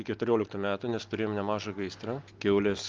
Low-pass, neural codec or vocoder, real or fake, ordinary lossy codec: 7.2 kHz; none; real; Opus, 24 kbps